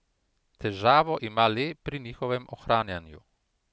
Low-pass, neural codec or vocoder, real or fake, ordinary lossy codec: none; none; real; none